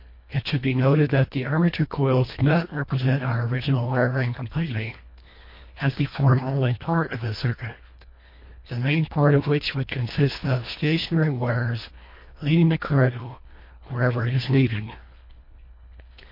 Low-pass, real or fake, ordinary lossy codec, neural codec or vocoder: 5.4 kHz; fake; MP3, 32 kbps; codec, 24 kHz, 1.5 kbps, HILCodec